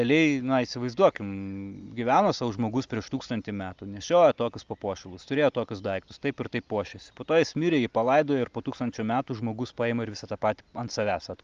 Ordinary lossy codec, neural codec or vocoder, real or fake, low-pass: Opus, 32 kbps; none; real; 7.2 kHz